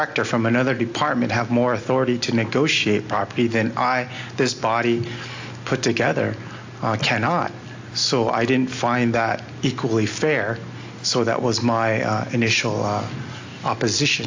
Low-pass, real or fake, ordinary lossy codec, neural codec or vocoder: 7.2 kHz; real; AAC, 48 kbps; none